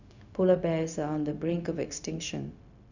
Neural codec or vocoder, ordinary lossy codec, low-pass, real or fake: codec, 16 kHz, 0.4 kbps, LongCat-Audio-Codec; none; 7.2 kHz; fake